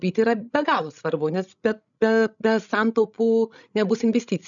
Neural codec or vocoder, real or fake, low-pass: codec, 16 kHz, 16 kbps, FreqCodec, larger model; fake; 7.2 kHz